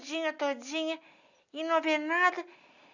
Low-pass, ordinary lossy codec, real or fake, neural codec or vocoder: 7.2 kHz; none; real; none